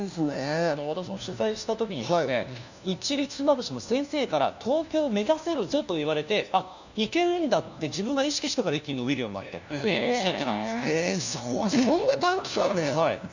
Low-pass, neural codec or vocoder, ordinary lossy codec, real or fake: 7.2 kHz; codec, 16 kHz, 1 kbps, FunCodec, trained on LibriTTS, 50 frames a second; none; fake